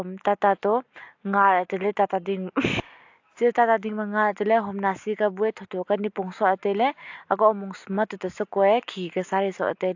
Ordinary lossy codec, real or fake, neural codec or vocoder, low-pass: AAC, 48 kbps; real; none; 7.2 kHz